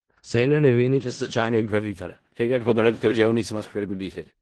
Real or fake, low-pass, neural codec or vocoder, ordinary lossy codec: fake; 10.8 kHz; codec, 16 kHz in and 24 kHz out, 0.4 kbps, LongCat-Audio-Codec, four codebook decoder; Opus, 16 kbps